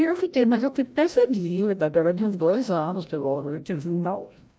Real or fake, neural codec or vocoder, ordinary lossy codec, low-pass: fake; codec, 16 kHz, 0.5 kbps, FreqCodec, larger model; none; none